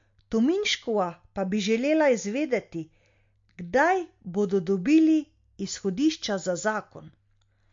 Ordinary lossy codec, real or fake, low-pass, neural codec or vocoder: MP3, 48 kbps; real; 7.2 kHz; none